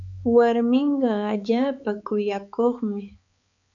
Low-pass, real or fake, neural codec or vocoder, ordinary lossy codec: 7.2 kHz; fake; codec, 16 kHz, 4 kbps, X-Codec, HuBERT features, trained on balanced general audio; MP3, 96 kbps